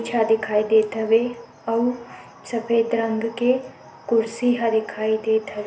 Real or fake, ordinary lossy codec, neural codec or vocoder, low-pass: real; none; none; none